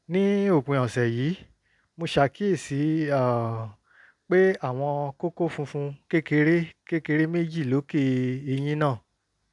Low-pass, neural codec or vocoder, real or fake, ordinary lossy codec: 10.8 kHz; none; real; none